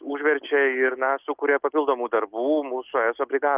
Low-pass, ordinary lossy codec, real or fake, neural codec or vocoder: 3.6 kHz; Opus, 32 kbps; real; none